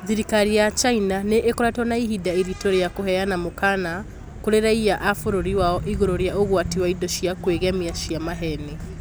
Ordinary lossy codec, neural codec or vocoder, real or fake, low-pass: none; none; real; none